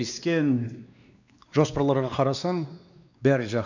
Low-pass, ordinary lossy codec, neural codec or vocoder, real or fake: 7.2 kHz; none; codec, 16 kHz, 2 kbps, X-Codec, WavLM features, trained on Multilingual LibriSpeech; fake